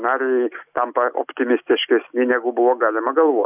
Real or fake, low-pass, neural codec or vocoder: real; 3.6 kHz; none